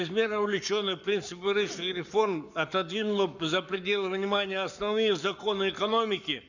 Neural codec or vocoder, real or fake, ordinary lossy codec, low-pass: codec, 44.1 kHz, 7.8 kbps, Pupu-Codec; fake; none; 7.2 kHz